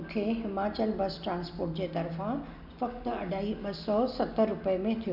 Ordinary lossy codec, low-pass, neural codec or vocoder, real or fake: none; 5.4 kHz; none; real